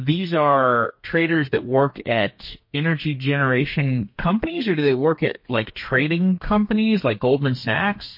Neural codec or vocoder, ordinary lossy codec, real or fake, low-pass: codec, 32 kHz, 1.9 kbps, SNAC; MP3, 32 kbps; fake; 5.4 kHz